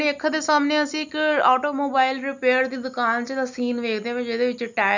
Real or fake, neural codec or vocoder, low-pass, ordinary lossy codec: real; none; 7.2 kHz; none